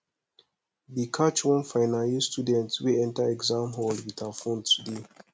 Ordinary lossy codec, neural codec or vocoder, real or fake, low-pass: none; none; real; none